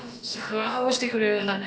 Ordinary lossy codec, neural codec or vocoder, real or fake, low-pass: none; codec, 16 kHz, about 1 kbps, DyCAST, with the encoder's durations; fake; none